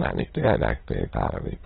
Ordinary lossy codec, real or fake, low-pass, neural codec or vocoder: AAC, 16 kbps; fake; 9.9 kHz; autoencoder, 22.05 kHz, a latent of 192 numbers a frame, VITS, trained on many speakers